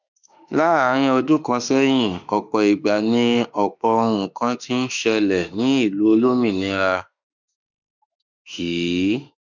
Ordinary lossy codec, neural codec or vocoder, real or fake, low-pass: none; autoencoder, 48 kHz, 32 numbers a frame, DAC-VAE, trained on Japanese speech; fake; 7.2 kHz